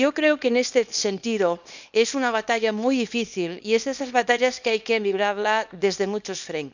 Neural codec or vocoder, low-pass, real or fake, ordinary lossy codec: codec, 24 kHz, 0.9 kbps, WavTokenizer, small release; 7.2 kHz; fake; none